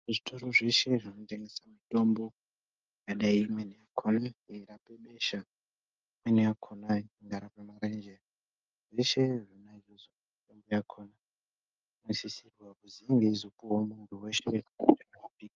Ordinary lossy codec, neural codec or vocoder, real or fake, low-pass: Opus, 24 kbps; none; real; 7.2 kHz